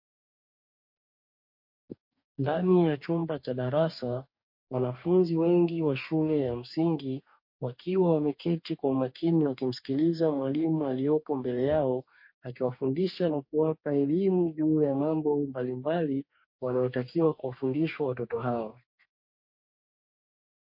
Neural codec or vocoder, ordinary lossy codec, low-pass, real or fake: codec, 44.1 kHz, 2.6 kbps, DAC; MP3, 32 kbps; 5.4 kHz; fake